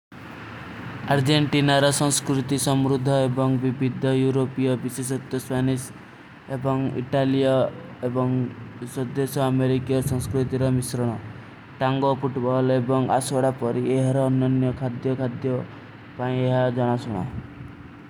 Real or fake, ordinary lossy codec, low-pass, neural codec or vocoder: real; none; 19.8 kHz; none